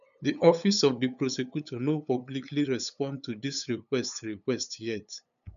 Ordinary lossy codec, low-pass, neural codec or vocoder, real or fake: none; 7.2 kHz; codec, 16 kHz, 8 kbps, FunCodec, trained on LibriTTS, 25 frames a second; fake